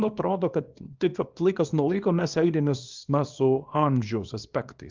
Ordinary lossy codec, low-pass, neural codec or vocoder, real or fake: Opus, 32 kbps; 7.2 kHz; codec, 24 kHz, 0.9 kbps, WavTokenizer, small release; fake